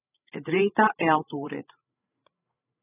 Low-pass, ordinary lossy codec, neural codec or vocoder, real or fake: 3.6 kHz; AAC, 16 kbps; codec, 16 kHz, 8 kbps, FreqCodec, larger model; fake